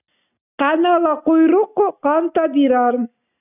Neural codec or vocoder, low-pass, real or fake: none; 3.6 kHz; real